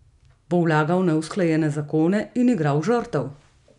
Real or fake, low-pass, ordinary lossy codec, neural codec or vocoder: real; 10.8 kHz; none; none